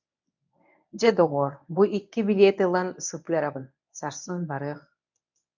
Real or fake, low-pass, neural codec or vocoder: fake; 7.2 kHz; codec, 24 kHz, 0.9 kbps, WavTokenizer, medium speech release version 1